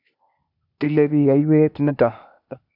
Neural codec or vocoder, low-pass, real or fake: codec, 16 kHz, 0.8 kbps, ZipCodec; 5.4 kHz; fake